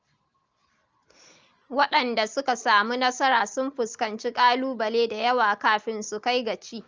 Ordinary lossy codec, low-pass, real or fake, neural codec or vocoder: Opus, 24 kbps; 7.2 kHz; real; none